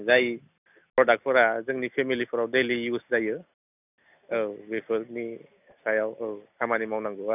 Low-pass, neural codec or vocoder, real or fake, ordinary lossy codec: 3.6 kHz; none; real; none